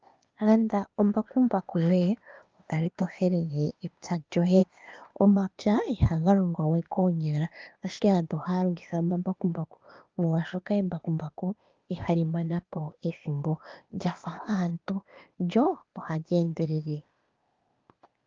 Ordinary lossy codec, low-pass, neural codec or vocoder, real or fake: Opus, 24 kbps; 7.2 kHz; codec, 16 kHz, 0.8 kbps, ZipCodec; fake